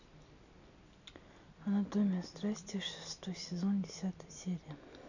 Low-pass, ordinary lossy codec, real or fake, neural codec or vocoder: 7.2 kHz; none; real; none